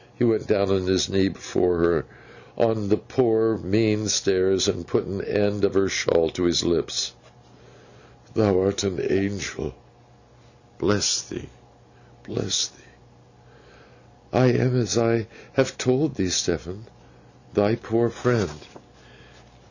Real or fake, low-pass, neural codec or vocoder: real; 7.2 kHz; none